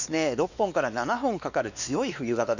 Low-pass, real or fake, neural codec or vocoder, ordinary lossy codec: 7.2 kHz; fake; codec, 16 kHz, 2 kbps, X-Codec, WavLM features, trained on Multilingual LibriSpeech; none